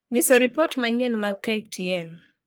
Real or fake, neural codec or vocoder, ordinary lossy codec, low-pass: fake; codec, 44.1 kHz, 1.7 kbps, Pupu-Codec; none; none